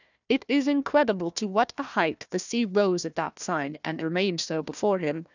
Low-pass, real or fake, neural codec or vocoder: 7.2 kHz; fake; codec, 16 kHz, 1 kbps, FunCodec, trained on Chinese and English, 50 frames a second